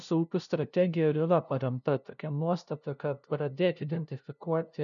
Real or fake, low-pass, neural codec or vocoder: fake; 7.2 kHz; codec, 16 kHz, 0.5 kbps, FunCodec, trained on LibriTTS, 25 frames a second